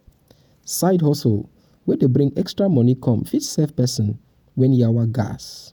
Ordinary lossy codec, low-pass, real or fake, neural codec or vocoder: none; 19.8 kHz; fake; vocoder, 48 kHz, 128 mel bands, Vocos